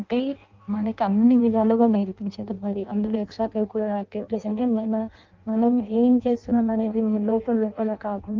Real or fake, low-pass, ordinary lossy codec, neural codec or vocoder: fake; 7.2 kHz; Opus, 24 kbps; codec, 16 kHz in and 24 kHz out, 0.6 kbps, FireRedTTS-2 codec